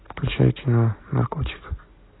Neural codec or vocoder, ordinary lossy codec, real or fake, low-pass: none; AAC, 16 kbps; real; 7.2 kHz